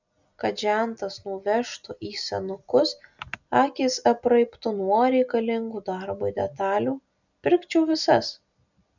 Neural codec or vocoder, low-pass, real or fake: none; 7.2 kHz; real